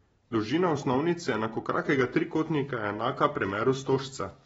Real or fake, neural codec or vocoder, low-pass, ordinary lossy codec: real; none; 14.4 kHz; AAC, 24 kbps